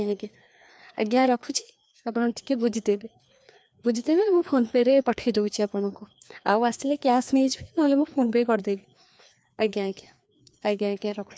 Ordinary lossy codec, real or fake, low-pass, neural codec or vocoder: none; fake; none; codec, 16 kHz, 2 kbps, FreqCodec, larger model